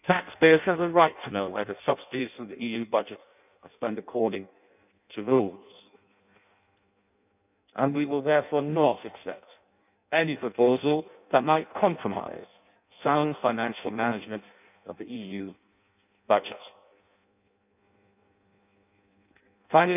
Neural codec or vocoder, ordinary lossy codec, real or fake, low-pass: codec, 16 kHz in and 24 kHz out, 0.6 kbps, FireRedTTS-2 codec; none; fake; 3.6 kHz